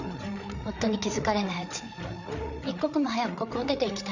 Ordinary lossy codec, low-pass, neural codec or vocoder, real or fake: none; 7.2 kHz; codec, 16 kHz, 8 kbps, FreqCodec, larger model; fake